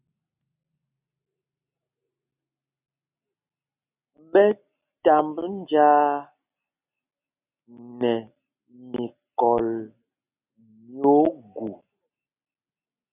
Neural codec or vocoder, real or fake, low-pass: none; real; 3.6 kHz